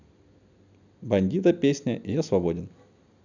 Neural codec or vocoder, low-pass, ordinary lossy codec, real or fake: none; 7.2 kHz; none; real